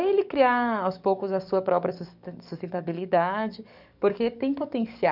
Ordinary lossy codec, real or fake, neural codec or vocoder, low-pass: none; fake; codec, 44.1 kHz, 7.8 kbps, DAC; 5.4 kHz